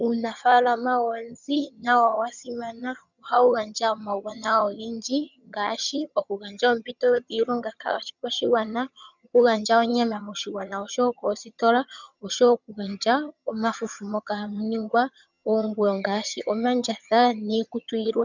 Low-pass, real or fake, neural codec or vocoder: 7.2 kHz; fake; vocoder, 22.05 kHz, 80 mel bands, HiFi-GAN